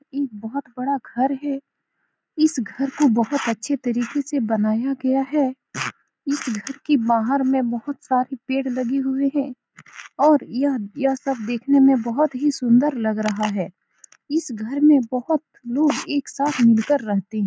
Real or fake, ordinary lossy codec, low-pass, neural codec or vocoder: real; none; none; none